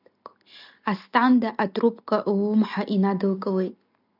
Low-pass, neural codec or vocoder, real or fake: 5.4 kHz; none; real